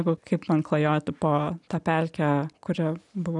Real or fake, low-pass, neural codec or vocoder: real; 10.8 kHz; none